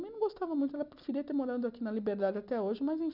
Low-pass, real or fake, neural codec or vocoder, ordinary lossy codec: 5.4 kHz; real; none; none